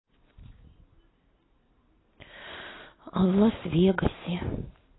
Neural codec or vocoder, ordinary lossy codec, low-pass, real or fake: none; AAC, 16 kbps; 7.2 kHz; real